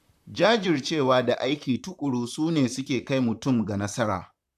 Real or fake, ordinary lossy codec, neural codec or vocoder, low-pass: fake; none; vocoder, 44.1 kHz, 128 mel bands, Pupu-Vocoder; 14.4 kHz